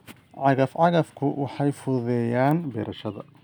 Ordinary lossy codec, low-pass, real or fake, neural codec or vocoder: none; none; real; none